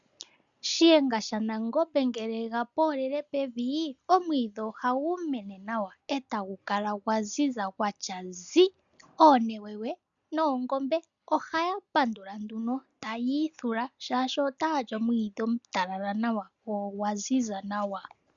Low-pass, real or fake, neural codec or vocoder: 7.2 kHz; real; none